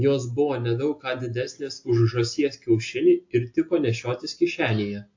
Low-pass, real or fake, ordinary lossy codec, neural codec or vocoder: 7.2 kHz; real; AAC, 48 kbps; none